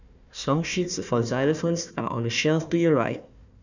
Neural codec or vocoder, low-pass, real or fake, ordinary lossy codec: codec, 16 kHz, 1 kbps, FunCodec, trained on Chinese and English, 50 frames a second; 7.2 kHz; fake; none